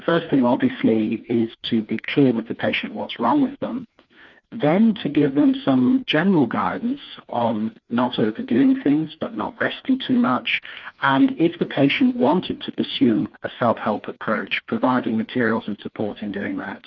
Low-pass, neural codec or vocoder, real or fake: 7.2 kHz; codec, 16 kHz, 2 kbps, FreqCodec, larger model; fake